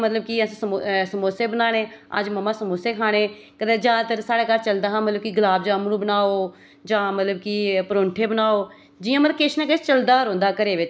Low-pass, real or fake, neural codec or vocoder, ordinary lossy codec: none; real; none; none